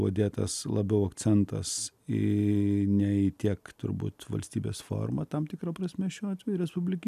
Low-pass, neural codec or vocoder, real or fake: 14.4 kHz; none; real